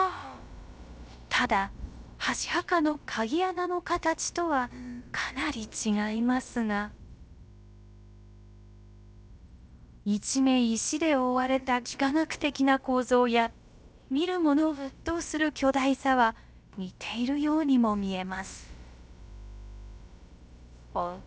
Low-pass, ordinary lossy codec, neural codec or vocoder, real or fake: none; none; codec, 16 kHz, about 1 kbps, DyCAST, with the encoder's durations; fake